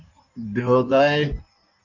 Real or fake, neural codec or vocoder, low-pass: fake; codec, 16 kHz in and 24 kHz out, 1.1 kbps, FireRedTTS-2 codec; 7.2 kHz